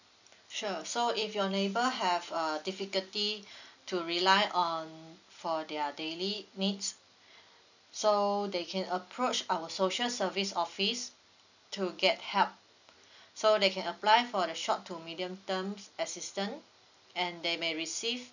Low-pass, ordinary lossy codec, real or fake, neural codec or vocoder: 7.2 kHz; none; real; none